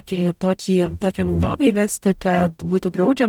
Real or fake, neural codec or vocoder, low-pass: fake; codec, 44.1 kHz, 0.9 kbps, DAC; 19.8 kHz